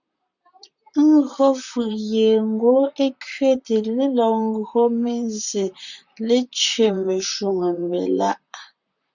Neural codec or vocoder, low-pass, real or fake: vocoder, 44.1 kHz, 128 mel bands, Pupu-Vocoder; 7.2 kHz; fake